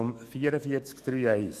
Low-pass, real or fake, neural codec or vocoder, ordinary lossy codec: 14.4 kHz; fake; autoencoder, 48 kHz, 128 numbers a frame, DAC-VAE, trained on Japanese speech; none